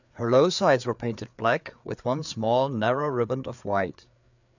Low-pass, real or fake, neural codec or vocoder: 7.2 kHz; fake; codec, 16 kHz, 4 kbps, FreqCodec, larger model